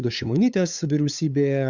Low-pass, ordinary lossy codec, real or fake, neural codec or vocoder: 7.2 kHz; Opus, 64 kbps; fake; codec, 16 kHz, 8 kbps, FunCodec, trained on LibriTTS, 25 frames a second